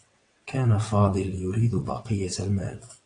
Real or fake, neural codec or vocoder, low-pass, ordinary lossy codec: fake; vocoder, 22.05 kHz, 80 mel bands, WaveNeXt; 9.9 kHz; AAC, 48 kbps